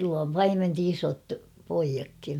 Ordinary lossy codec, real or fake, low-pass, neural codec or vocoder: none; real; 19.8 kHz; none